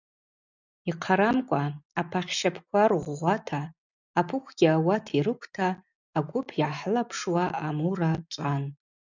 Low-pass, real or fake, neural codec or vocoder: 7.2 kHz; real; none